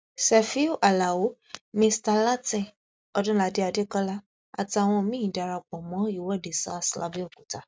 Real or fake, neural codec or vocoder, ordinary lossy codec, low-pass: real; none; none; none